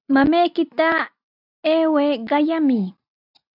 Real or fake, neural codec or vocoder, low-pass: real; none; 5.4 kHz